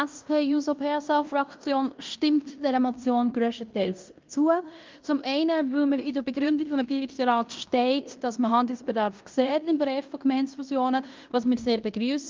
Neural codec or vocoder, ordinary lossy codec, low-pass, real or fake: codec, 16 kHz in and 24 kHz out, 0.9 kbps, LongCat-Audio-Codec, fine tuned four codebook decoder; Opus, 24 kbps; 7.2 kHz; fake